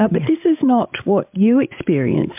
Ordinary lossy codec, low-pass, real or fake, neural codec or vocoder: MP3, 32 kbps; 3.6 kHz; fake; codec, 16 kHz, 8 kbps, FreqCodec, larger model